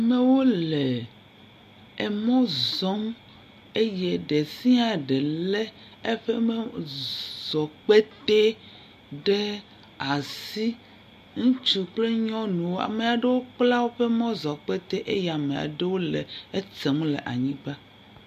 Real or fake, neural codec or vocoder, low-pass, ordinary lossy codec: real; none; 14.4 kHz; MP3, 64 kbps